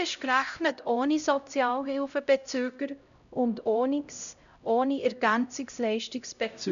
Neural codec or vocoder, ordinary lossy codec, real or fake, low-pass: codec, 16 kHz, 0.5 kbps, X-Codec, HuBERT features, trained on LibriSpeech; none; fake; 7.2 kHz